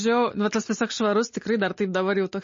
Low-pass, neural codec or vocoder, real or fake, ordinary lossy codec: 7.2 kHz; none; real; MP3, 32 kbps